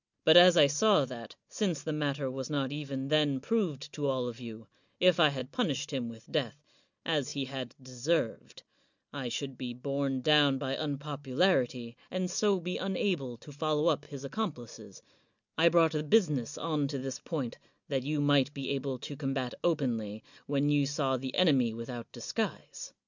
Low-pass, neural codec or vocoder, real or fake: 7.2 kHz; none; real